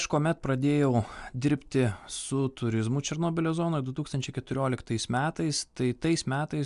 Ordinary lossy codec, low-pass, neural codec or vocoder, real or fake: MP3, 96 kbps; 10.8 kHz; none; real